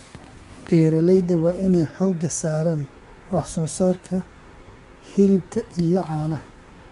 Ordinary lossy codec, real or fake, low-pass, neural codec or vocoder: MP3, 64 kbps; fake; 10.8 kHz; codec, 24 kHz, 1 kbps, SNAC